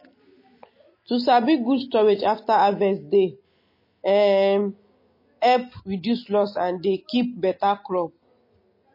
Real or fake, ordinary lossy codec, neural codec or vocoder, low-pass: real; MP3, 24 kbps; none; 5.4 kHz